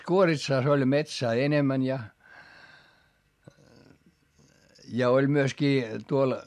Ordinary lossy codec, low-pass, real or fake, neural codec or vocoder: MP3, 64 kbps; 14.4 kHz; real; none